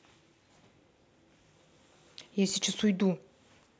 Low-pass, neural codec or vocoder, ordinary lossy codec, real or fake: none; none; none; real